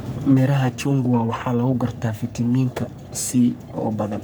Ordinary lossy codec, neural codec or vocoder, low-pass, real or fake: none; codec, 44.1 kHz, 3.4 kbps, Pupu-Codec; none; fake